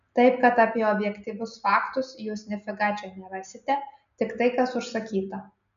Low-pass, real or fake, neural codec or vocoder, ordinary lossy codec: 7.2 kHz; real; none; MP3, 96 kbps